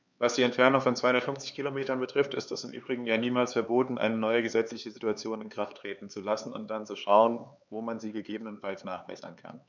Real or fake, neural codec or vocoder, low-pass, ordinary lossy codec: fake; codec, 16 kHz, 4 kbps, X-Codec, HuBERT features, trained on LibriSpeech; 7.2 kHz; none